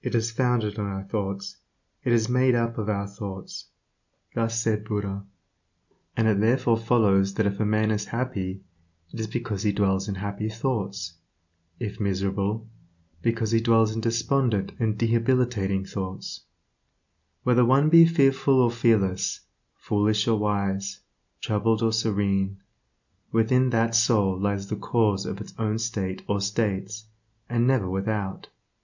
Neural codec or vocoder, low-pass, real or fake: none; 7.2 kHz; real